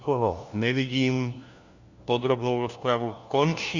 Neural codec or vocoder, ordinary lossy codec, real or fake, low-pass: codec, 16 kHz, 1 kbps, FunCodec, trained on LibriTTS, 50 frames a second; Opus, 64 kbps; fake; 7.2 kHz